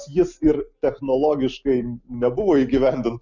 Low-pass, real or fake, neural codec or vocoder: 7.2 kHz; real; none